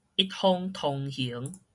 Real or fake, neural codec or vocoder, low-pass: real; none; 10.8 kHz